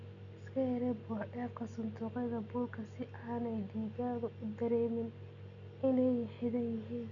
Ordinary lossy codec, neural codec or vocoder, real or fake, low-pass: none; none; real; 7.2 kHz